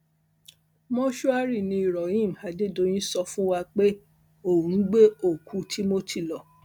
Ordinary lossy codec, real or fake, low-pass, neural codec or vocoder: none; real; 19.8 kHz; none